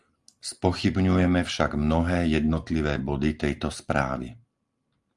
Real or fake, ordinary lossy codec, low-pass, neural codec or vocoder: real; Opus, 32 kbps; 10.8 kHz; none